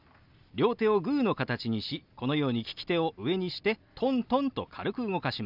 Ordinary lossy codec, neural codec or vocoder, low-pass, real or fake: none; none; 5.4 kHz; real